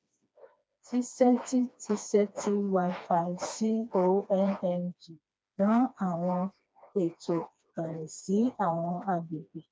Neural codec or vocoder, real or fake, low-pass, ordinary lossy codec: codec, 16 kHz, 2 kbps, FreqCodec, smaller model; fake; none; none